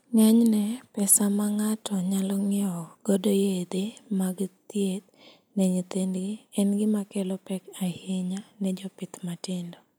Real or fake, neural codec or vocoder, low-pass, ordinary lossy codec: real; none; none; none